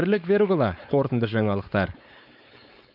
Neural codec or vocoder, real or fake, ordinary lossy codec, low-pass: codec, 16 kHz, 4.8 kbps, FACodec; fake; none; 5.4 kHz